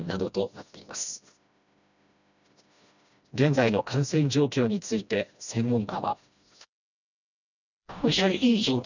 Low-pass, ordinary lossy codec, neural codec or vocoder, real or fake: 7.2 kHz; none; codec, 16 kHz, 1 kbps, FreqCodec, smaller model; fake